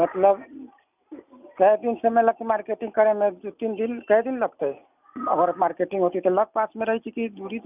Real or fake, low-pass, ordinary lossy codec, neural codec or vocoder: real; 3.6 kHz; none; none